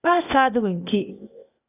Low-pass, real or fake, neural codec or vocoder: 3.6 kHz; fake; codec, 16 kHz, 0.8 kbps, ZipCodec